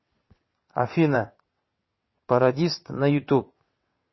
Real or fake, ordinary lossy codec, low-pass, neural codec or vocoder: fake; MP3, 24 kbps; 7.2 kHz; vocoder, 22.05 kHz, 80 mel bands, WaveNeXt